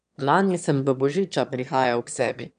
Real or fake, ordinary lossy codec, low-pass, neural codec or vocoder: fake; none; 9.9 kHz; autoencoder, 22.05 kHz, a latent of 192 numbers a frame, VITS, trained on one speaker